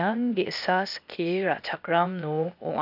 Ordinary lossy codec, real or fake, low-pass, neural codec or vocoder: AAC, 48 kbps; fake; 5.4 kHz; codec, 16 kHz, 0.8 kbps, ZipCodec